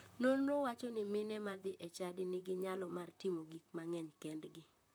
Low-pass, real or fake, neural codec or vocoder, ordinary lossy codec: none; fake; vocoder, 44.1 kHz, 128 mel bands, Pupu-Vocoder; none